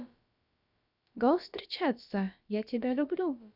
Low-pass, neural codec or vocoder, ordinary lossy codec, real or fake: 5.4 kHz; codec, 16 kHz, about 1 kbps, DyCAST, with the encoder's durations; none; fake